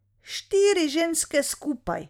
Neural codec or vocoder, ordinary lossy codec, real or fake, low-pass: vocoder, 44.1 kHz, 128 mel bands every 256 samples, BigVGAN v2; none; fake; 19.8 kHz